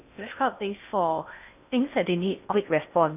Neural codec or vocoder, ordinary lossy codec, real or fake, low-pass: codec, 16 kHz in and 24 kHz out, 0.6 kbps, FocalCodec, streaming, 2048 codes; none; fake; 3.6 kHz